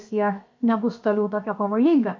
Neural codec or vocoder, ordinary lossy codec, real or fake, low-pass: codec, 16 kHz, 0.7 kbps, FocalCodec; MP3, 48 kbps; fake; 7.2 kHz